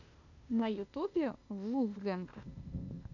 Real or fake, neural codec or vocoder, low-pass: fake; codec, 16 kHz, 0.8 kbps, ZipCodec; 7.2 kHz